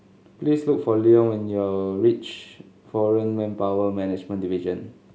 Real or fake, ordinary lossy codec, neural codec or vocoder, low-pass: real; none; none; none